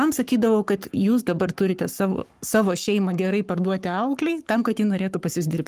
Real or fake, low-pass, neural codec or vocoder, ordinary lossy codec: fake; 14.4 kHz; codec, 44.1 kHz, 7.8 kbps, Pupu-Codec; Opus, 32 kbps